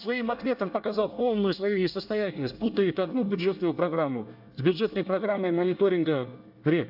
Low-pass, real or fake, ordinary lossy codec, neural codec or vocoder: 5.4 kHz; fake; AAC, 48 kbps; codec, 24 kHz, 1 kbps, SNAC